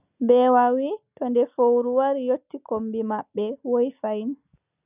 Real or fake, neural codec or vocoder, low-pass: real; none; 3.6 kHz